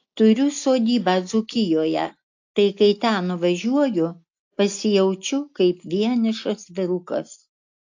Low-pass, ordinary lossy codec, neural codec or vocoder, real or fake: 7.2 kHz; AAC, 48 kbps; none; real